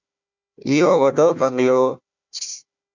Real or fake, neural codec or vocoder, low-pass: fake; codec, 16 kHz, 1 kbps, FunCodec, trained on Chinese and English, 50 frames a second; 7.2 kHz